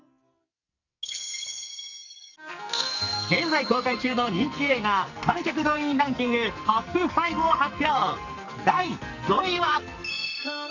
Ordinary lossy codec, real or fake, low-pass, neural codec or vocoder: none; fake; 7.2 kHz; codec, 44.1 kHz, 2.6 kbps, SNAC